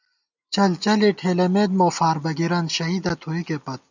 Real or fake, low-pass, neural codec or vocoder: real; 7.2 kHz; none